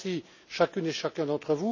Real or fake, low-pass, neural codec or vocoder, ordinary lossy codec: real; 7.2 kHz; none; none